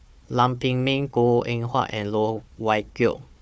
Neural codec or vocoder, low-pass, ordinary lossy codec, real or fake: codec, 16 kHz, 4 kbps, FunCodec, trained on Chinese and English, 50 frames a second; none; none; fake